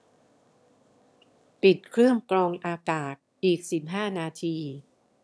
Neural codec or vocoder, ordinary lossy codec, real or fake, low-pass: autoencoder, 22.05 kHz, a latent of 192 numbers a frame, VITS, trained on one speaker; none; fake; none